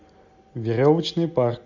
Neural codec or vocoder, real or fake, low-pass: none; real; 7.2 kHz